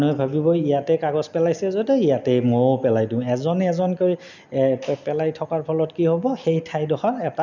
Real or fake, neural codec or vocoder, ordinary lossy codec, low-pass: real; none; none; 7.2 kHz